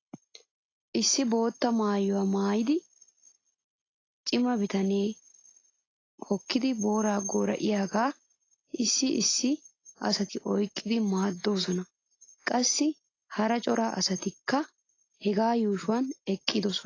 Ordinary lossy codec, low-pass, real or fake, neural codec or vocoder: AAC, 32 kbps; 7.2 kHz; real; none